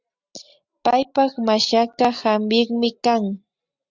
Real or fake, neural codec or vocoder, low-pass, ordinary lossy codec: real; none; 7.2 kHz; Opus, 64 kbps